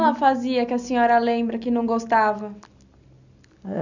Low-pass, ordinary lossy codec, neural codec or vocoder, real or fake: 7.2 kHz; none; none; real